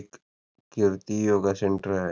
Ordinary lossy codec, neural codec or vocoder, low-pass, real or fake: none; none; none; real